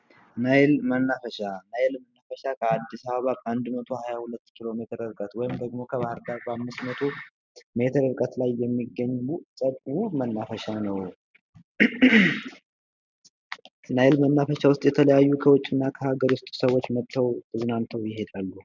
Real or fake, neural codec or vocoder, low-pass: real; none; 7.2 kHz